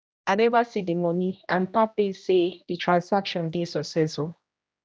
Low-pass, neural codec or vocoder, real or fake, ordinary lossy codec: none; codec, 16 kHz, 1 kbps, X-Codec, HuBERT features, trained on general audio; fake; none